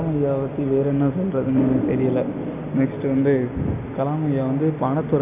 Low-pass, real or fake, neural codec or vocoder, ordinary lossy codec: 3.6 kHz; real; none; none